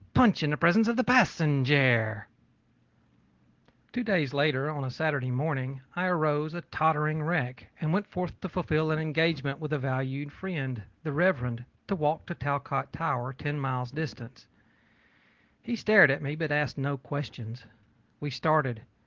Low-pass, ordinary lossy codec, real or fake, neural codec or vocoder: 7.2 kHz; Opus, 16 kbps; real; none